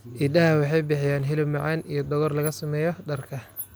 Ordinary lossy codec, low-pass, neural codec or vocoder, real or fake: none; none; none; real